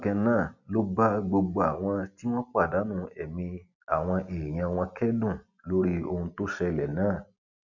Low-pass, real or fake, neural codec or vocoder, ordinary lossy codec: 7.2 kHz; real; none; none